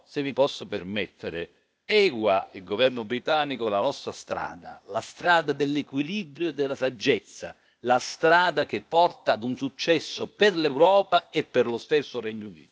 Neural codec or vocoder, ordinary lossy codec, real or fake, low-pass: codec, 16 kHz, 0.8 kbps, ZipCodec; none; fake; none